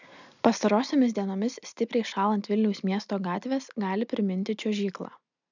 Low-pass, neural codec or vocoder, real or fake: 7.2 kHz; none; real